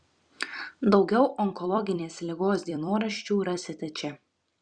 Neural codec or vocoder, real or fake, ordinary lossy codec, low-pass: vocoder, 44.1 kHz, 128 mel bands every 256 samples, BigVGAN v2; fake; Opus, 64 kbps; 9.9 kHz